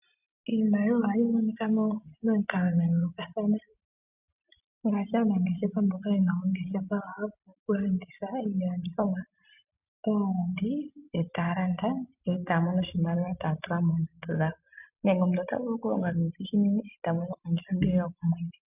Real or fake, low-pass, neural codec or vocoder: real; 3.6 kHz; none